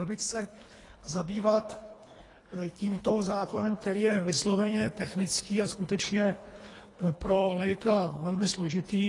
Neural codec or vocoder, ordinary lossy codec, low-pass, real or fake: codec, 24 kHz, 1.5 kbps, HILCodec; AAC, 32 kbps; 10.8 kHz; fake